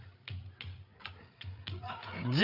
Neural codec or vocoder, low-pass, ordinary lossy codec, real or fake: codec, 16 kHz, 8 kbps, FreqCodec, larger model; 5.4 kHz; none; fake